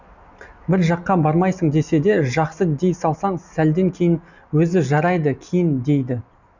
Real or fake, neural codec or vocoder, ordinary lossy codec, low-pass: real; none; none; 7.2 kHz